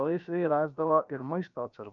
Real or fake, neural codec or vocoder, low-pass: fake; codec, 16 kHz, about 1 kbps, DyCAST, with the encoder's durations; 7.2 kHz